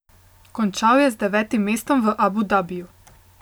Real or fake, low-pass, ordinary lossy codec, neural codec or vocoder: real; none; none; none